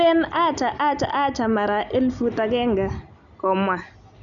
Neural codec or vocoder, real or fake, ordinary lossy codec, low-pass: none; real; none; 7.2 kHz